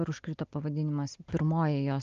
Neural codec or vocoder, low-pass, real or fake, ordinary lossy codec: none; 7.2 kHz; real; Opus, 32 kbps